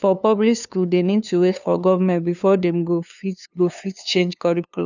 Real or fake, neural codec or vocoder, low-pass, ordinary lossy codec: fake; codec, 16 kHz, 2 kbps, FunCodec, trained on LibriTTS, 25 frames a second; 7.2 kHz; none